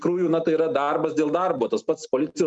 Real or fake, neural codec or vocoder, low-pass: real; none; 9.9 kHz